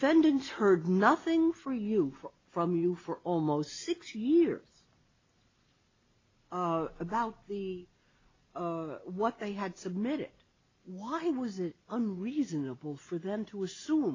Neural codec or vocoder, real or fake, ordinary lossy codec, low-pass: none; real; AAC, 32 kbps; 7.2 kHz